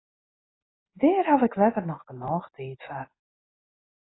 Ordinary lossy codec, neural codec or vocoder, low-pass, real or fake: AAC, 16 kbps; none; 7.2 kHz; real